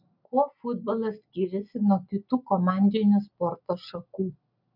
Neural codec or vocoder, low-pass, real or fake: none; 5.4 kHz; real